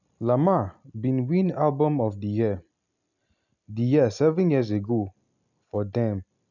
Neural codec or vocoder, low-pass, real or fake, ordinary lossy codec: none; 7.2 kHz; real; none